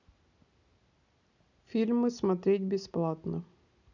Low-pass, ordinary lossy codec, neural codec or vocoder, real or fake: 7.2 kHz; none; none; real